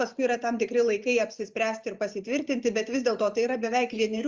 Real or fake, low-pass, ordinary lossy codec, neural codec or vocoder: real; 7.2 kHz; Opus, 32 kbps; none